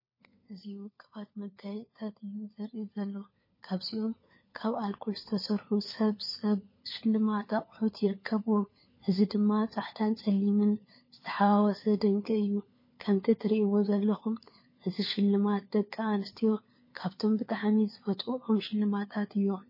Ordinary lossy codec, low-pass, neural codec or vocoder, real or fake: MP3, 24 kbps; 5.4 kHz; codec, 16 kHz, 4 kbps, FunCodec, trained on LibriTTS, 50 frames a second; fake